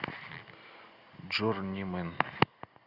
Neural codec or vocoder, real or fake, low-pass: none; real; 5.4 kHz